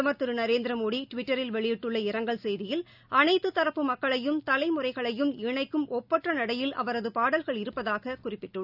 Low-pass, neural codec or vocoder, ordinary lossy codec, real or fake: 5.4 kHz; none; none; real